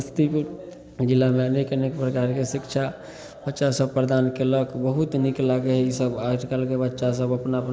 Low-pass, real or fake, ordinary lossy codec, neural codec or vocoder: none; real; none; none